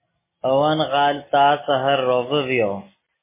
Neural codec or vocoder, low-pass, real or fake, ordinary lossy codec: none; 3.6 kHz; real; MP3, 16 kbps